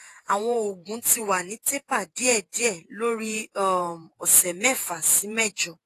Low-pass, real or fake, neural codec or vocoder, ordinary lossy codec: 14.4 kHz; fake; vocoder, 48 kHz, 128 mel bands, Vocos; AAC, 48 kbps